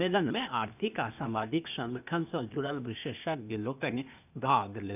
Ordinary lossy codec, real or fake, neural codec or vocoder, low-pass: none; fake; codec, 16 kHz, 0.8 kbps, ZipCodec; 3.6 kHz